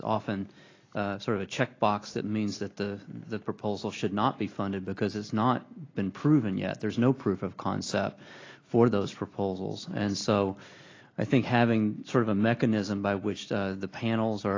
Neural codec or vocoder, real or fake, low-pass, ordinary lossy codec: none; real; 7.2 kHz; AAC, 32 kbps